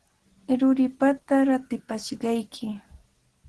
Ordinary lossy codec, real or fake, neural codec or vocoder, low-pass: Opus, 16 kbps; real; none; 10.8 kHz